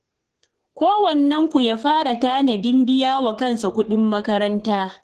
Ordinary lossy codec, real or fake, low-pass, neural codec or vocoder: Opus, 16 kbps; fake; 14.4 kHz; codec, 32 kHz, 1.9 kbps, SNAC